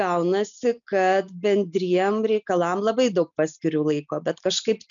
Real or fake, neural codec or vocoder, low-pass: real; none; 7.2 kHz